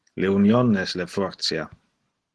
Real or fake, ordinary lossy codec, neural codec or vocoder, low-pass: real; Opus, 16 kbps; none; 10.8 kHz